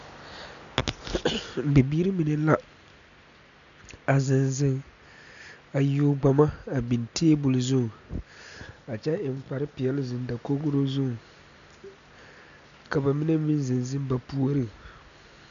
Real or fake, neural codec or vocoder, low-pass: real; none; 7.2 kHz